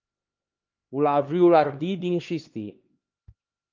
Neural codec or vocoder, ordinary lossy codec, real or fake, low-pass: codec, 16 kHz, 2 kbps, X-Codec, HuBERT features, trained on LibriSpeech; Opus, 32 kbps; fake; 7.2 kHz